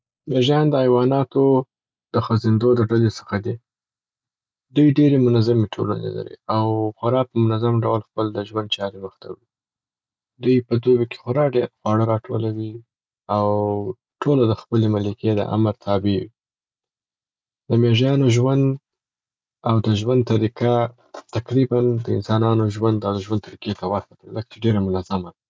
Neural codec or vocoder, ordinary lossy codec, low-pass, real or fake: none; none; none; real